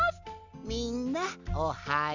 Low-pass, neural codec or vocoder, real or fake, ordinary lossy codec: 7.2 kHz; none; real; none